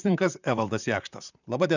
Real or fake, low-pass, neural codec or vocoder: fake; 7.2 kHz; vocoder, 44.1 kHz, 128 mel bands, Pupu-Vocoder